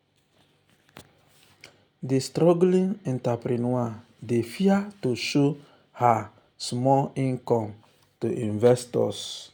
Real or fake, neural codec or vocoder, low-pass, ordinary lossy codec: real; none; 19.8 kHz; none